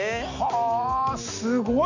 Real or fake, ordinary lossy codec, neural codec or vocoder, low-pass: real; none; none; 7.2 kHz